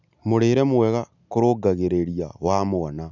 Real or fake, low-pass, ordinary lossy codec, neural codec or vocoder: real; 7.2 kHz; none; none